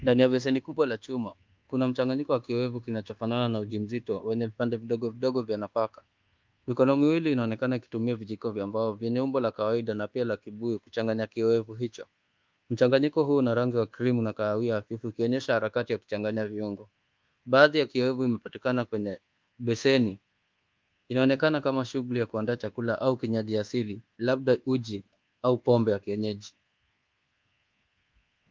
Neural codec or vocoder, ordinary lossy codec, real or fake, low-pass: codec, 24 kHz, 1.2 kbps, DualCodec; Opus, 32 kbps; fake; 7.2 kHz